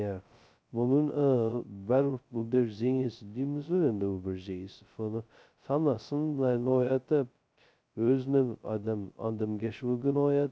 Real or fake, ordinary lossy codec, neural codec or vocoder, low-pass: fake; none; codec, 16 kHz, 0.2 kbps, FocalCodec; none